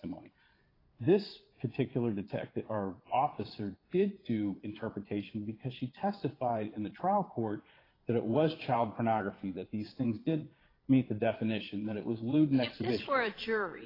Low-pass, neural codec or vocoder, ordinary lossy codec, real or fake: 5.4 kHz; vocoder, 22.05 kHz, 80 mel bands, Vocos; AAC, 24 kbps; fake